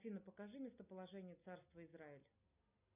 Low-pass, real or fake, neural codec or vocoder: 3.6 kHz; real; none